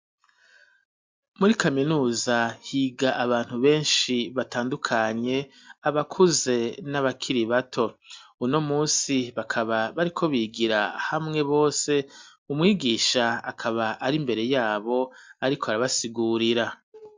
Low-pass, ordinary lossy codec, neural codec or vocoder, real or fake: 7.2 kHz; MP3, 64 kbps; none; real